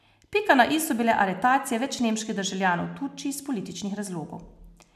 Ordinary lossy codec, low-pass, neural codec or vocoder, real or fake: none; 14.4 kHz; none; real